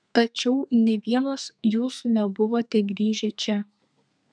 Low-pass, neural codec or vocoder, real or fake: 9.9 kHz; codec, 32 kHz, 1.9 kbps, SNAC; fake